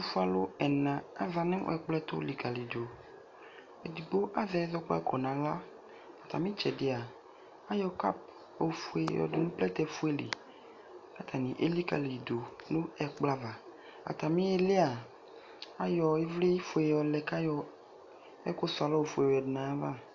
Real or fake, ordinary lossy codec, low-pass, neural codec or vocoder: real; AAC, 48 kbps; 7.2 kHz; none